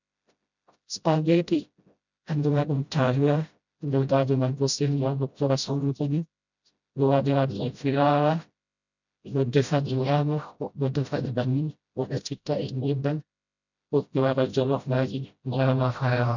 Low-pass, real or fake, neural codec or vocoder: 7.2 kHz; fake; codec, 16 kHz, 0.5 kbps, FreqCodec, smaller model